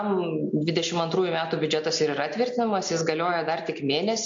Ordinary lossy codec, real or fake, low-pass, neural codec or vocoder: MP3, 48 kbps; real; 7.2 kHz; none